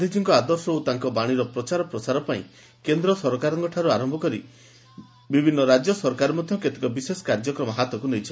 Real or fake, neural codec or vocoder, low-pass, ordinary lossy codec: real; none; none; none